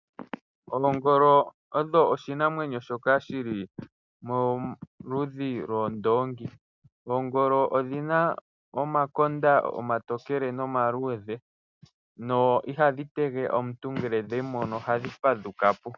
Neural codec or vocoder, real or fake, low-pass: none; real; 7.2 kHz